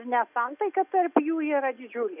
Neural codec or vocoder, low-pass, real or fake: none; 3.6 kHz; real